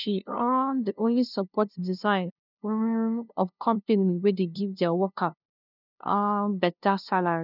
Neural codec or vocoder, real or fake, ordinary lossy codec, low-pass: codec, 16 kHz, 0.5 kbps, FunCodec, trained on LibriTTS, 25 frames a second; fake; none; 5.4 kHz